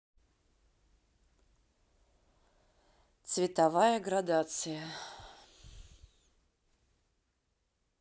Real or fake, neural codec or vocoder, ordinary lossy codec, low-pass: real; none; none; none